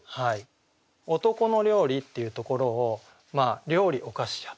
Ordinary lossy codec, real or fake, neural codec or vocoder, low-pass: none; real; none; none